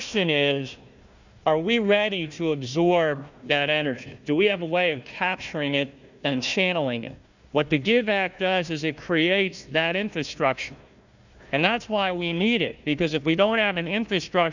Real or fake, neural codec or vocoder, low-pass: fake; codec, 16 kHz, 1 kbps, FunCodec, trained on Chinese and English, 50 frames a second; 7.2 kHz